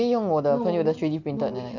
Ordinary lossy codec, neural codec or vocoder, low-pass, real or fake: none; none; 7.2 kHz; real